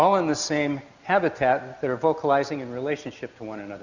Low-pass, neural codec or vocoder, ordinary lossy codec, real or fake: 7.2 kHz; none; Opus, 64 kbps; real